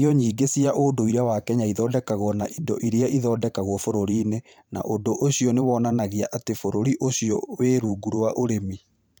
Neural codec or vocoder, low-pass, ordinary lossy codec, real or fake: vocoder, 44.1 kHz, 128 mel bands every 512 samples, BigVGAN v2; none; none; fake